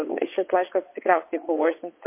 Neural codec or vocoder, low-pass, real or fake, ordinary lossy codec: vocoder, 22.05 kHz, 80 mel bands, WaveNeXt; 3.6 kHz; fake; MP3, 24 kbps